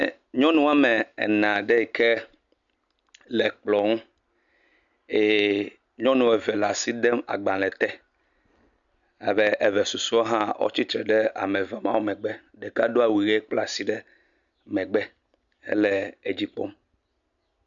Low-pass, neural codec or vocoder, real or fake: 7.2 kHz; none; real